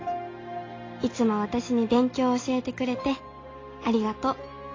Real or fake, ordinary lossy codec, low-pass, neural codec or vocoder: real; AAC, 32 kbps; 7.2 kHz; none